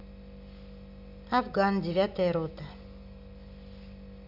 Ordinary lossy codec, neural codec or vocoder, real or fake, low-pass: none; none; real; 5.4 kHz